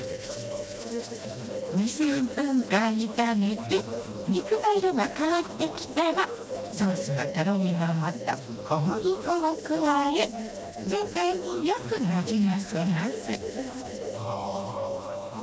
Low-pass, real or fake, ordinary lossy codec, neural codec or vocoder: none; fake; none; codec, 16 kHz, 1 kbps, FreqCodec, smaller model